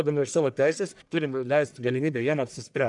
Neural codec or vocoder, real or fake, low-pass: codec, 44.1 kHz, 1.7 kbps, Pupu-Codec; fake; 10.8 kHz